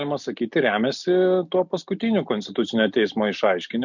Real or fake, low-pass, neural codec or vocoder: real; 7.2 kHz; none